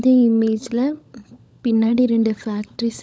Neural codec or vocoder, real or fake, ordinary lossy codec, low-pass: codec, 16 kHz, 16 kbps, FunCodec, trained on LibriTTS, 50 frames a second; fake; none; none